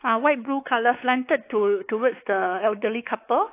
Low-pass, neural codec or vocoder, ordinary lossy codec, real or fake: 3.6 kHz; codec, 16 kHz, 4 kbps, X-Codec, WavLM features, trained on Multilingual LibriSpeech; AAC, 24 kbps; fake